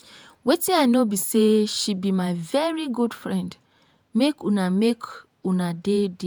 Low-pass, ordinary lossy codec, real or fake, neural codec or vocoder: none; none; fake; vocoder, 48 kHz, 128 mel bands, Vocos